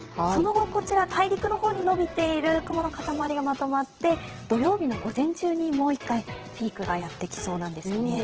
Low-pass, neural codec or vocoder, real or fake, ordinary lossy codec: 7.2 kHz; vocoder, 22.05 kHz, 80 mel bands, WaveNeXt; fake; Opus, 16 kbps